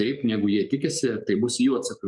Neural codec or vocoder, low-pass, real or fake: codec, 44.1 kHz, 7.8 kbps, DAC; 10.8 kHz; fake